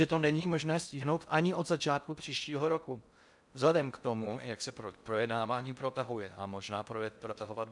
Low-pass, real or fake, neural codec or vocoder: 10.8 kHz; fake; codec, 16 kHz in and 24 kHz out, 0.6 kbps, FocalCodec, streaming, 4096 codes